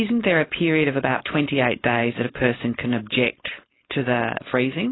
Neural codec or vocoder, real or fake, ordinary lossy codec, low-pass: codec, 16 kHz, 4.8 kbps, FACodec; fake; AAC, 16 kbps; 7.2 kHz